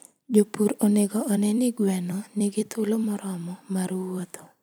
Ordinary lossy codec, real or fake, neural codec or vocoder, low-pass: none; fake; vocoder, 44.1 kHz, 128 mel bands every 256 samples, BigVGAN v2; none